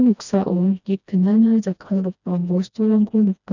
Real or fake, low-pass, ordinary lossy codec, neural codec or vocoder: fake; 7.2 kHz; none; codec, 16 kHz, 1 kbps, FreqCodec, smaller model